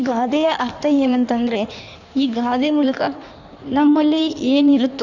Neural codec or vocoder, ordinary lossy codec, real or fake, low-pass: codec, 16 kHz in and 24 kHz out, 1.1 kbps, FireRedTTS-2 codec; none; fake; 7.2 kHz